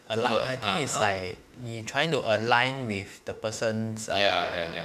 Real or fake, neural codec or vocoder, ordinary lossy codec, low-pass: fake; autoencoder, 48 kHz, 32 numbers a frame, DAC-VAE, trained on Japanese speech; none; 14.4 kHz